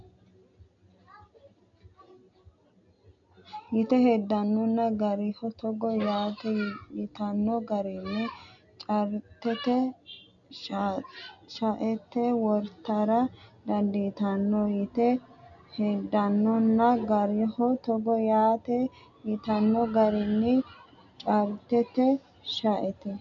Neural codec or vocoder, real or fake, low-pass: none; real; 7.2 kHz